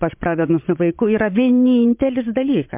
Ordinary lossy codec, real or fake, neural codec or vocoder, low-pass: MP3, 32 kbps; fake; vocoder, 22.05 kHz, 80 mel bands, WaveNeXt; 3.6 kHz